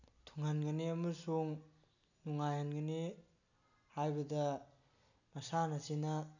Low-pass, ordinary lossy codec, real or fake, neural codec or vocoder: 7.2 kHz; none; real; none